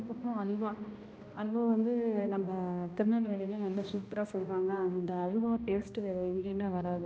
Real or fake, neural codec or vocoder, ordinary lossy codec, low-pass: fake; codec, 16 kHz, 1 kbps, X-Codec, HuBERT features, trained on balanced general audio; none; none